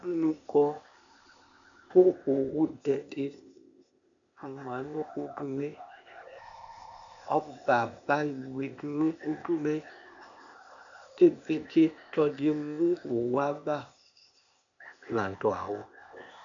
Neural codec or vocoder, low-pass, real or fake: codec, 16 kHz, 0.8 kbps, ZipCodec; 7.2 kHz; fake